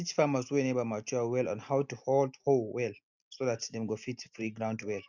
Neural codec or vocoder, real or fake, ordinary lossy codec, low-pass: none; real; none; 7.2 kHz